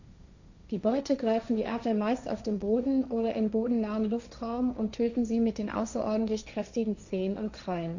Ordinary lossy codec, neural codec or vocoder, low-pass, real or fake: none; codec, 16 kHz, 1.1 kbps, Voila-Tokenizer; 7.2 kHz; fake